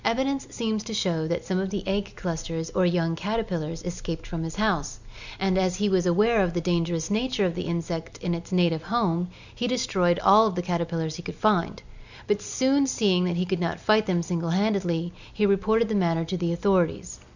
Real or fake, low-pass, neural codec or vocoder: real; 7.2 kHz; none